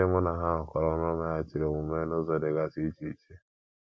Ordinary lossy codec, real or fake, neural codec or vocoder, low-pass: none; real; none; none